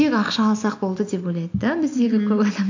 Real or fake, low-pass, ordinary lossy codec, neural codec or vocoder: real; 7.2 kHz; AAC, 48 kbps; none